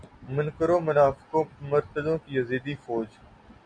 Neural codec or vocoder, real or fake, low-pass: none; real; 9.9 kHz